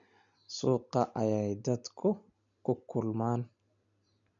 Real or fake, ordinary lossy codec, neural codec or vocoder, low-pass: real; none; none; 7.2 kHz